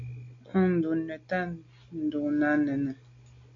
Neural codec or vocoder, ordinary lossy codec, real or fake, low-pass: none; AAC, 48 kbps; real; 7.2 kHz